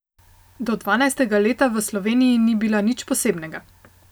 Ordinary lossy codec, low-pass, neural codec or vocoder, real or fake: none; none; none; real